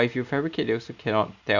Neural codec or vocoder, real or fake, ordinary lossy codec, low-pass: none; real; none; 7.2 kHz